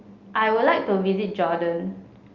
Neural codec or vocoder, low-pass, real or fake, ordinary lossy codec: none; 7.2 kHz; real; Opus, 32 kbps